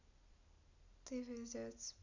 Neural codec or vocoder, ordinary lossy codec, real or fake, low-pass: none; none; real; 7.2 kHz